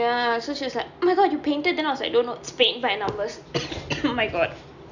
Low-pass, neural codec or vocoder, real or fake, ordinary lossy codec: 7.2 kHz; none; real; none